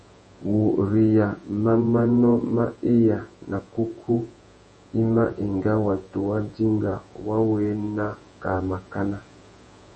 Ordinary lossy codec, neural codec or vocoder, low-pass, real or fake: MP3, 32 kbps; vocoder, 48 kHz, 128 mel bands, Vocos; 10.8 kHz; fake